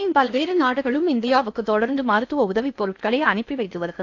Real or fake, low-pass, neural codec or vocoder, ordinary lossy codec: fake; 7.2 kHz; codec, 16 kHz in and 24 kHz out, 0.6 kbps, FocalCodec, streaming, 4096 codes; AAC, 48 kbps